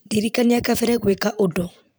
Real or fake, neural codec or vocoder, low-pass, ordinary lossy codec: fake; vocoder, 44.1 kHz, 128 mel bands every 512 samples, BigVGAN v2; none; none